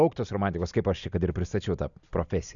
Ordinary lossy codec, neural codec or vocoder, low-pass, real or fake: MP3, 96 kbps; none; 7.2 kHz; real